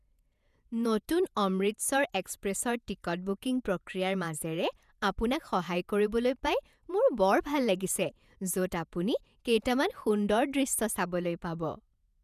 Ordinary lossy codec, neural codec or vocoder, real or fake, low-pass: none; vocoder, 48 kHz, 128 mel bands, Vocos; fake; 14.4 kHz